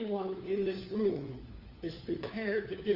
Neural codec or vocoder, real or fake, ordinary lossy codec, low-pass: codec, 16 kHz, 4 kbps, FunCodec, trained on LibriTTS, 50 frames a second; fake; Opus, 24 kbps; 5.4 kHz